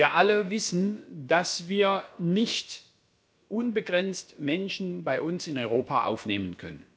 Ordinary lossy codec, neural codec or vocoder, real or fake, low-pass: none; codec, 16 kHz, about 1 kbps, DyCAST, with the encoder's durations; fake; none